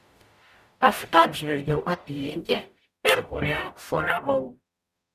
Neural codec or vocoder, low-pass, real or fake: codec, 44.1 kHz, 0.9 kbps, DAC; 14.4 kHz; fake